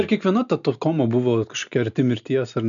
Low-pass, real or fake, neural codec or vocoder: 7.2 kHz; real; none